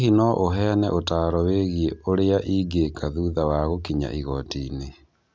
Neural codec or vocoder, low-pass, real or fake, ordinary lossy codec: none; none; real; none